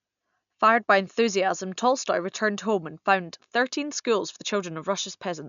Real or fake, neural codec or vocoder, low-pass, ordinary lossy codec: real; none; 7.2 kHz; none